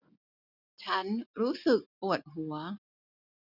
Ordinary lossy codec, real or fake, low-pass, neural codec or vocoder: none; real; 5.4 kHz; none